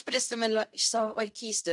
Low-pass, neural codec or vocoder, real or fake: 10.8 kHz; codec, 16 kHz in and 24 kHz out, 0.4 kbps, LongCat-Audio-Codec, fine tuned four codebook decoder; fake